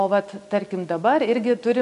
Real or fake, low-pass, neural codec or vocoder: real; 10.8 kHz; none